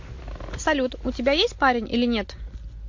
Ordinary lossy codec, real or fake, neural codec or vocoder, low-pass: MP3, 48 kbps; real; none; 7.2 kHz